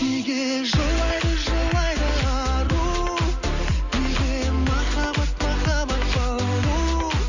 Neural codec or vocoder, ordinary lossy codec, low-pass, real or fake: none; none; 7.2 kHz; real